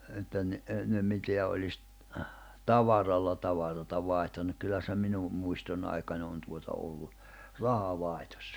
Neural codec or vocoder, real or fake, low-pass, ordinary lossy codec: none; real; none; none